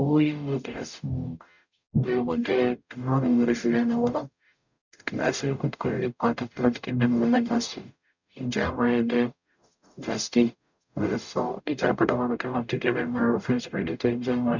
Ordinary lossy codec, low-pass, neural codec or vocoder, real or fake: none; 7.2 kHz; codec, 44.1 kHz, 0.9 kbps, DAC; fake